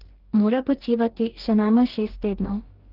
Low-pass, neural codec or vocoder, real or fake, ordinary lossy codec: 5.4 kHz; codec, 44.1 kHz, 2.6 kbps, DAC; fake; Opus, 16 kbps